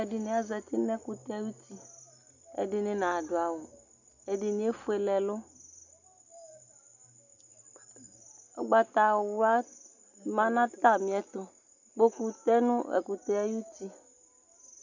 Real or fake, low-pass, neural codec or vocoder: real; 7.2 kHz; none